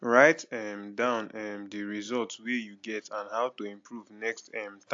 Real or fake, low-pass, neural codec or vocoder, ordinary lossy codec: real; 7.2 kHz; none; AAC, 48 kbps